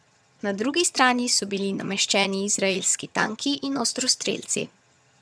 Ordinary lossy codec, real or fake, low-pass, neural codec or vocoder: none; fake; none; vocoder, 22.05 kHz, 80 mel bands, HiFi-GAN